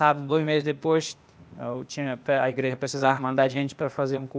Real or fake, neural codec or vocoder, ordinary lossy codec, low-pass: fake; codec, 16 kHz, 0.8 kbps, ZipCodec; none; none